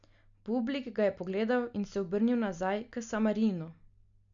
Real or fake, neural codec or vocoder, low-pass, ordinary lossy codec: real; none; 7.2 kHz; none